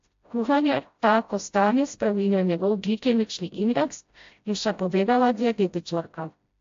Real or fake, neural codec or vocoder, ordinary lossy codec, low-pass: fake; codec, 16 kHz, 0.5 kbps, FreqCodec, smaller model; AAC, 48 kbps; 7.2 kHz